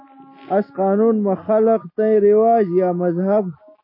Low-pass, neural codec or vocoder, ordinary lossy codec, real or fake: 5.4 kHz; none; MP3, 24 kbps; real